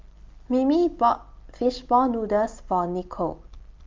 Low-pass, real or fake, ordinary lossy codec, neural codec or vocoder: 7.2 kHz; real; Opus, 32 kbps; none